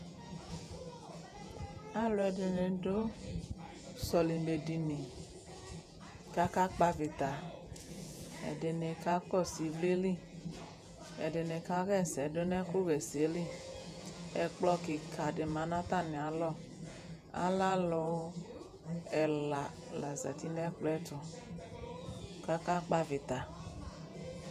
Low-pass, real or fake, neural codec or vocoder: 14.4 kHz; fake; vocoder, 48 kHz, 128 mel bands, Vocos